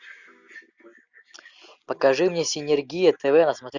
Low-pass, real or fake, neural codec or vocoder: 7.2 kHz; real; none